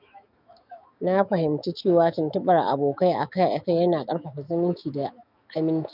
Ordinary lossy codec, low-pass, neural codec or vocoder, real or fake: none; 5.4 kHz; autoencoder, 48 kHz, 128 numbers a frame, DAC-VAE, trained on Japanese speech; fake